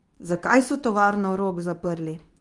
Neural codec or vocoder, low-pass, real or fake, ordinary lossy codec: codec, 24 kHz, 0.9 kbps, WavTokenizer, medium speech release version 2; 10.8 kHz; fake; Opus, 32 kbps